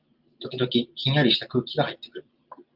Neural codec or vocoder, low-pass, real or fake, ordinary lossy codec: none; 5.4 kHz; real; Opus, 24 kbps